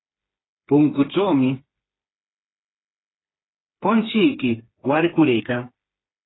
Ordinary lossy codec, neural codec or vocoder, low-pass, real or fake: AAC, 16 kbps; codec, 16 kHz, 4 kbps, FreqCodec, smaller model; 7.2 kHz; fake